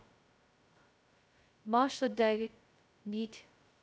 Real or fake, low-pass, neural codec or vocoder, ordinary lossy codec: fake; none; codec, 16 kHz, 0.2 kbps, FocalCodec; none